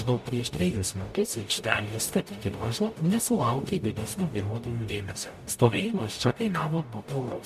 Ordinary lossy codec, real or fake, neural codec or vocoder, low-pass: MP3, 64 kbps; fake; codec, 44.1 kHz, 0.9 kbps, DAC; 14.4 kHz